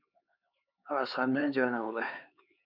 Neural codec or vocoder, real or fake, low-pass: codec, 16 kHz, 4 kbps, X-Codec, HuBERT features, trained on LibriSpeech; fake; 5.4 kHz